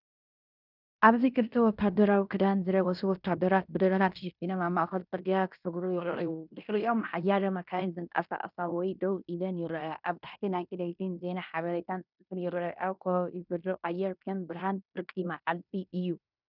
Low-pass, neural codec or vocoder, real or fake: 5.4 kHz; codec, 16 kHz in and 24 kHz out, 0.9 kbps, LongCat-Audio-Codec, fine tuned four codebook decoder; fake